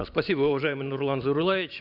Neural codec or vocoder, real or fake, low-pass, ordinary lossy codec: vocoder, 44.1 kHz, 128 mel bands every 512 samples, BigVGAN v2; fake; 5.4 kHz; none